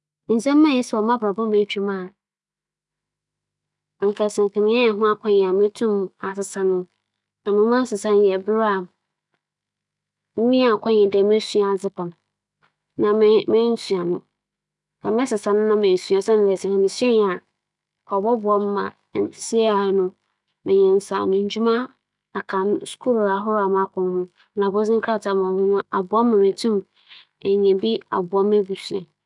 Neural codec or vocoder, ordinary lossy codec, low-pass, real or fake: autoencoder, 48 kHz, 128 numbers a frame, DAC-VAE, trained on Japanese speech; none; 10.8 kHz; fake